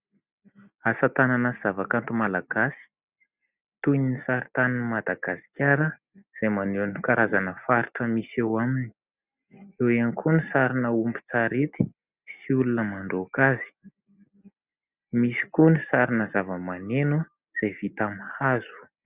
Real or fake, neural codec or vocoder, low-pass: real; none; 3.6 kHz